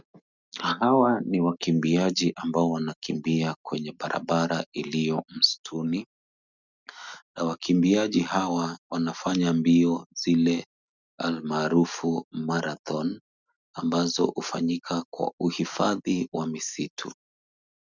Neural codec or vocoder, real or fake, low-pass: none; real; 7.2 kHz